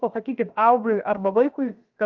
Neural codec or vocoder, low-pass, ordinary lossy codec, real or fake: codec, 16 kHz, about 1 kbps, DyCAST, with the encoder's durations; 7.2 kHz; Opus, 24 kbps; fake